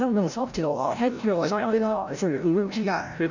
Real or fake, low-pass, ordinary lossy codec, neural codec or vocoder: fake; 7.2 kHz; none; codec, 16 kHz, 0.5 kbps, FreqCodec, larger model